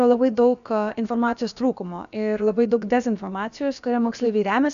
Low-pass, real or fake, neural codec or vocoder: 7.2 kHz; fake; codec, 16 kHz, about 1 kbps, DyCAST, with the encoder's durations